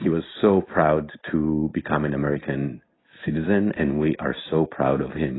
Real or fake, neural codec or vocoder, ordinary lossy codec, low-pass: real; none; AAC, 16 kbps; 7.2 kHz